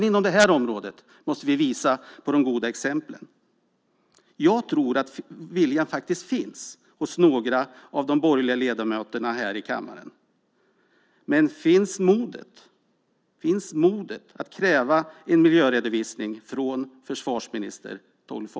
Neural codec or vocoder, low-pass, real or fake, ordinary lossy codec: none; none; real; none